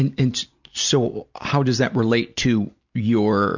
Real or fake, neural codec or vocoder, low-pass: real; none; 7.2 kHz